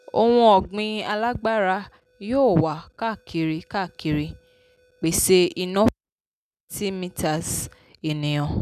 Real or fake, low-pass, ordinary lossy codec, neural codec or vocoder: real; 14.4 kHz; none; none